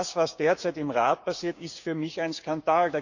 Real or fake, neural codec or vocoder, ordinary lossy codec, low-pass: fake; codec, 16 kHz, 6 kbps, DAC; none; 7.2 kHz